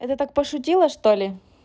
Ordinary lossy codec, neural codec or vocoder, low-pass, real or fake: none; none; none; real